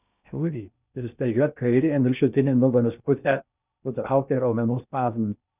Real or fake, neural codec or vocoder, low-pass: fake; codec, 16 kHz in and 24 kHz out, 0.6 kbps, FocalCodec, streaming, 2048 codes; 3.6 kHz